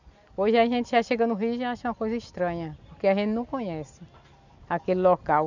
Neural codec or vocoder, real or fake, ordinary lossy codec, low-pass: none; real; none; 7.2 kHz